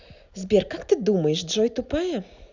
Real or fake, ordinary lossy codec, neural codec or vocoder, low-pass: real; none; none; 7.2 kHz